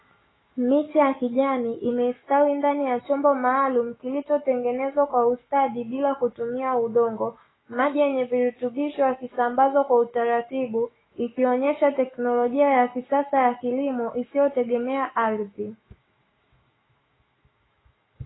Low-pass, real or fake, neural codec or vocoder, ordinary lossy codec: 7.2 kHz; fake; autoencoder, 48 kHz, 128 numbers a frame, DAC-VAE, trained on Japanese speech; AAC, 16 kbps